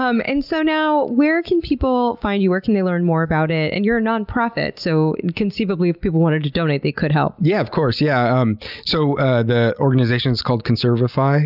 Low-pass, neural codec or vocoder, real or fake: 5.4 kHz; none; real